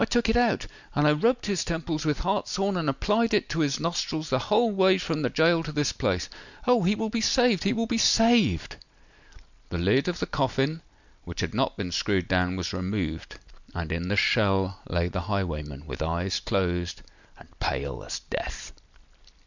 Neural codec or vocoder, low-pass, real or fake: none; 7.2 kHz; real